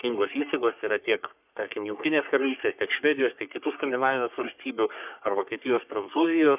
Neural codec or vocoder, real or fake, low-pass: codec, 44.1 kHz, 3.4 kbps, Pupu-Codec; fake; 3.6 kHz